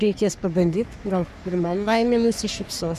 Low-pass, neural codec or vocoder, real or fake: 14.4 kHz; codec, 44.1 kHz, 3.4 kbps, Pupu-Codec; fake